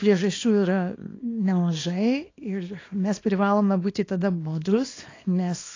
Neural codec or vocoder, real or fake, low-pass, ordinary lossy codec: codec, 24 kHz, 0.9 kbps, WavTokenizer, small release; fake; 7.2 kHz; AAC, 32 kbps